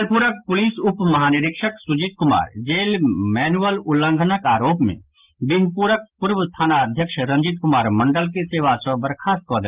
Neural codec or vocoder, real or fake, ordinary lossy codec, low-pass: none; real; Opus, 32 kbps; 3.6 kHz